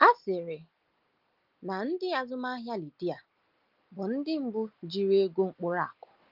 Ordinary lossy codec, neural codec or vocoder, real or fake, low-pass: Opus, 32 kbps; none; real; 5.4 kHz